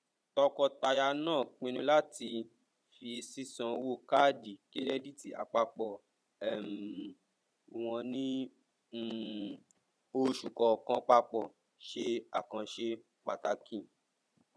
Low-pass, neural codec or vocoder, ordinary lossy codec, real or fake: none; vocoder, 22.05 kHz, 80 mel bands, Vocos; none; fake